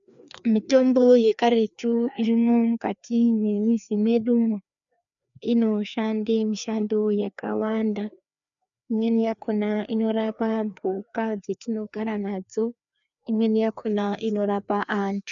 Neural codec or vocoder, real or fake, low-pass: codec, 16 kHz, 2 kbps, FreqCodec, larger model; fake; 7.2 kHz